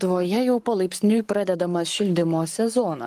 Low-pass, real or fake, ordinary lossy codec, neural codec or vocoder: 14.4 kHz; fake; Opus, 24 kbps; codec, 44.1 kHz, 7.8 kbps, Pupu-Codec